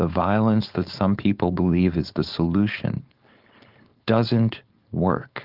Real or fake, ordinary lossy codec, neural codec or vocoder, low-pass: fake; Opus, 32 kbps; codec, 16 kHz, 4.8 kbps, FACodec; 5.4 kHz